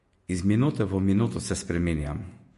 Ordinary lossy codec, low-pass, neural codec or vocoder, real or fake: MP3, 48 kbps; 14.4 kHz; vocoder, 48 kHz, 128 mel bands, Vocos; fake